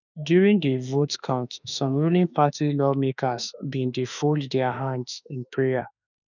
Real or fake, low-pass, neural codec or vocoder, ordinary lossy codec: fake; 7.2 kHz; autoencoder, 48 kHz, 32 numbers a frame, DAC-VAE, trained on Japanese speech; none